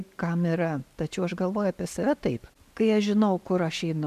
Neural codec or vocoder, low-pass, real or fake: none; 14.4 kHz; real